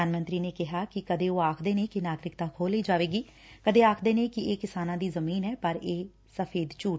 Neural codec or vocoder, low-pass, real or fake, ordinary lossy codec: none; none; real; none